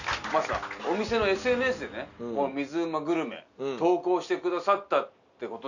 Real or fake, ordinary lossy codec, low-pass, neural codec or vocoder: real; none; 7.2 kHz; none